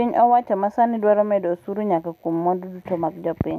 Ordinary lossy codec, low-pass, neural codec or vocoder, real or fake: none; 14.4 kHz; none; real